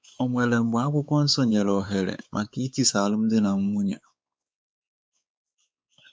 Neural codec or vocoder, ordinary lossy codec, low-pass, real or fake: codec, 16 kHz, 4 kbps, X-Codec, WavLM features, trained on Multilingual LibriSpeech; none; none; fake